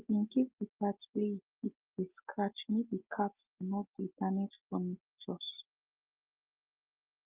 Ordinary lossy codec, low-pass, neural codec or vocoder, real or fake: Opus, 32 kbps; 3.6 kHz; none; real